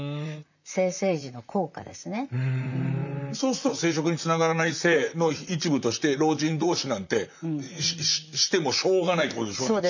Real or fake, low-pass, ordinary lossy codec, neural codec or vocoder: fake; 7.2 kHz; none; vocoder, 44.1 kHz, 128 mel bands, Pupu-Vocoder